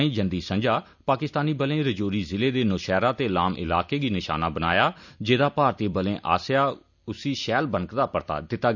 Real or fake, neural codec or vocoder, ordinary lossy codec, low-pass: real; none; none; 7.2 kHz